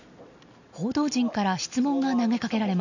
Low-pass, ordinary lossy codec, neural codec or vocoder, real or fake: 7.2 kHz; none; none; real